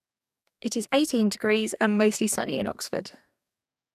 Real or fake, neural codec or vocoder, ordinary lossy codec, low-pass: fake; codec, 44.1 kHz, 2.6 kbps, DAC; none; 14.4 kHz